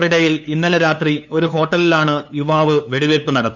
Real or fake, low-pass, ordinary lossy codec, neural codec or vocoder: fake; 7.2 kHz; none; codec, 16 kHz, 2 kbps, FunCodec, trained on LibriTTS, 25 frames a second